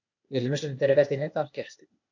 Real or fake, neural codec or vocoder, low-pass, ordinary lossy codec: fake; codec, 16 kHz, 0.8 kbps, ZipCodec; 7.2 kHz; MP3, 48 kbps